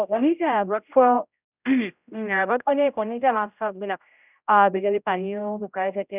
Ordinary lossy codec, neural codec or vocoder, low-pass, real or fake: none; codec, 16 kHz, 0.5 kbps, X-Codec, HuBERT features, trained on general audio; 3.6 kHz; fake